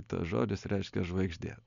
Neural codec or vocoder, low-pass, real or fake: none; 7.2 kHz; real